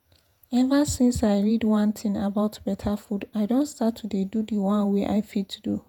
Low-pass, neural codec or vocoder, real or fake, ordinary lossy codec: 19.8 kHz; vocoder, 48 kHz, 128 mel bands, Vocos; fake; none